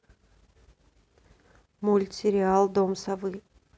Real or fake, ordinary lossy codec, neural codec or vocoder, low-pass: real; none; none; none